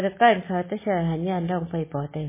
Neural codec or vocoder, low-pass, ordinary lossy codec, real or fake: none; 3.6 kHz; MP3, 16 kbps; real